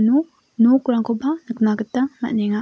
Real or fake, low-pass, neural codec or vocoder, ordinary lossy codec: real; none; none; none